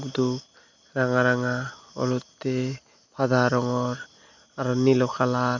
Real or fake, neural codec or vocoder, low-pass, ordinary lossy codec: real; none; 7.2 kHz; none